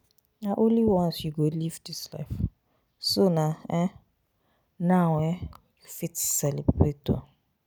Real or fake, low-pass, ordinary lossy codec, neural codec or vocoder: real; none; none; none